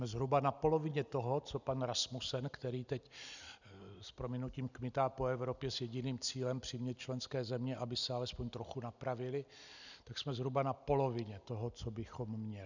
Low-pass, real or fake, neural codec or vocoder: 7.2 kHz; real; none